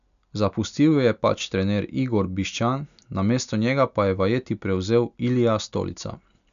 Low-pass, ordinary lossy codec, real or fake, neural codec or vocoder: 7.2 kHz; none; real; none